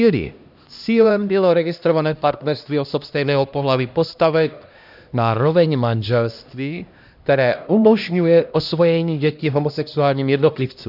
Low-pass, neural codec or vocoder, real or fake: 5.4 kHz; codec, 16 kHz, 1 kbps, X-Codec, HuBERT features, trained on LibriSpeech; fake